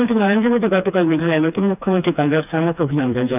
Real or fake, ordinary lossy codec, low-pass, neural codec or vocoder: fake; none; 3.6 kHz; codec, 16 kHz, 2 kbps, FreqCodec, smaller model